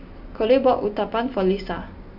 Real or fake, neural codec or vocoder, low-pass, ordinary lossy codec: real; none; 5.4 kHz; none